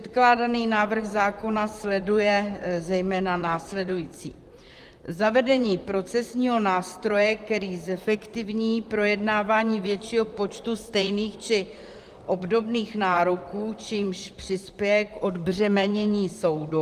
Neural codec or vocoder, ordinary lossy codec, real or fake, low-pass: vocoder, 44.1 kHz, 128 mel bands, Pupu-Vocoder; Opus, 32 kbps; fake; 14.4 kHz